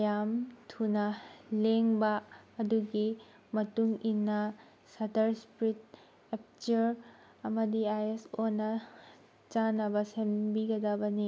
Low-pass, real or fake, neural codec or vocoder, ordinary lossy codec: none; real; none; none